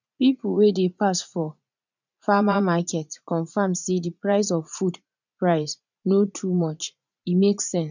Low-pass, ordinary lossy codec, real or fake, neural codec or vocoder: 7.2 kHz; none; fake; vocoder, 44.1 kHz, 80 mel bands, Vocos